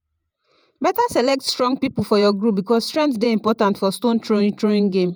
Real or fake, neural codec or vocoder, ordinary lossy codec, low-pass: fake; vocoder, 48 kHz, 128 mel bands, Vocos; none; none